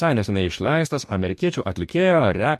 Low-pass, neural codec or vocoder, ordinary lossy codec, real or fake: 14.4 kHz; codec, 44.1 kHz, 2.6 kbps, DAC; MP3, 64 kbps; fake